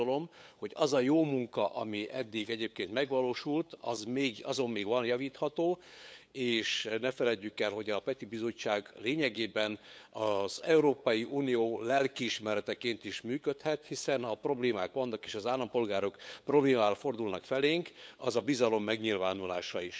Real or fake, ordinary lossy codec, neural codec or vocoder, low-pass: fake; none; codec, 16 kHz, 8 kbps, FunCodec, trained on LibriTTS, 25 frames a second; none